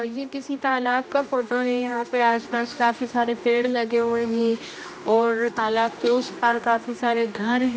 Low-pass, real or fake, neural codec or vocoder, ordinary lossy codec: none; fake; codec, 16 kHz, 1 kbps, X-Codec, HuBERT features, trained on general audio; none